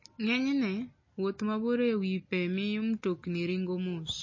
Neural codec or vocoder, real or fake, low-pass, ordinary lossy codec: none; real; 7.2 kHz; MP3, 32 kbps